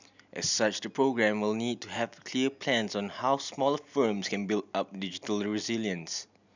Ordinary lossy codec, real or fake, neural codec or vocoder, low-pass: none; real; none; 7.2 kHz